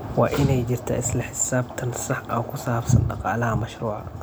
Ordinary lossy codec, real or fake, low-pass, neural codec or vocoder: none; real; none; none